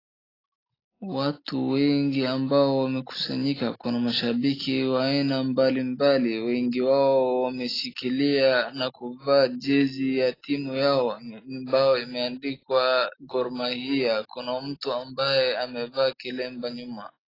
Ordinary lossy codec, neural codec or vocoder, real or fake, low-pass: AAC, 24 kbps; none; real; 5.4 kHz